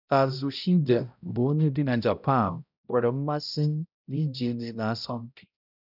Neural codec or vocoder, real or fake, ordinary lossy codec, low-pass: codec, 16 kHz, 0.5 kbps, X-Codec, HuBERT features, trained on balanced general audio; fake; none; 5.4 kHz